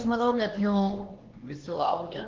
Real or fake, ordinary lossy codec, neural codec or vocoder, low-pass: fake; Opus, 16 kbps; codec, 16 kHz, 2 kbps, X-Codec, HuBERT features, trained on LibriSpeech; 7.2 kHz